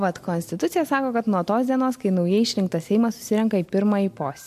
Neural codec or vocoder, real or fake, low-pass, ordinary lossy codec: none; real; 14.4 kHz; MP3, 64 kbps